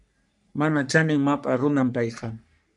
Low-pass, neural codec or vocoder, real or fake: 10.8 kHz; codec, 44.1 kHz, 3.4 kbps, Pupu-Codec; fake